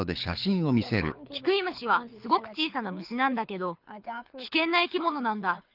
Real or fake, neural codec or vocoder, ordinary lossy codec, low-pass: fake; codec, 24 kHz, 6 kbps, HILCodec; Opus, 24 kbps; 5.4 kHz